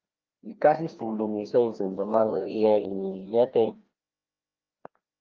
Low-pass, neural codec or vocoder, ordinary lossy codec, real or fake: 7.2 kHz; codec, 16 kHz, 1 kbps, FreqCodec, larger model; Opus, 32 kbps; fake